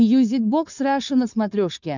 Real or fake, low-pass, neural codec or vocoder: fake; 7.2 kHz; codec, 16 kHz, 4.8 kbps, FACodec